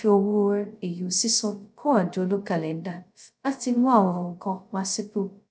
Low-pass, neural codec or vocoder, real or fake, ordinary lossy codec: none; codec, 16 kHz, 0.2 kbps, FocalCodec; fake; none